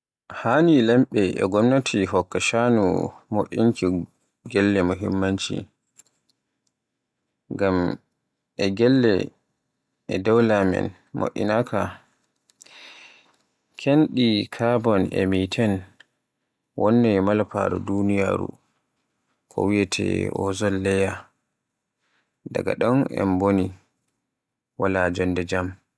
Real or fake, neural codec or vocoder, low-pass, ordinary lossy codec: real; none; none; none